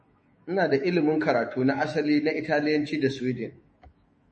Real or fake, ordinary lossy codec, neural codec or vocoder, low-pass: fake; MP3, 32 kbps; autoencoder, 48 kHz, 128 numbers a frame, DAC-VAE, trained on Japanese speech; 10.8 kHz